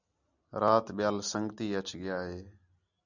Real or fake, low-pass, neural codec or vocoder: real; 7.2 kHz; none